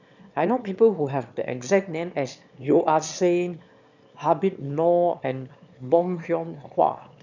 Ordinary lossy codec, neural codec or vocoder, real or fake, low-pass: none; autoencoder, 22.05 kHz, a latent of 192 numbers a frame, VITS, trained on one speaker; fake; 7.2 kHz